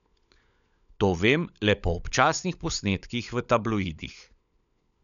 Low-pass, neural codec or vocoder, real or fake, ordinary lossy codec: 7.2 kHz; codec, 16 kHz, 16 kbps, FunCodec, trained on LibriTTS, 50 frames a second; fake; none